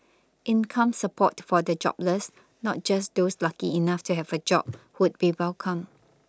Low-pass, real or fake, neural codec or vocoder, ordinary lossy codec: none; real; none; none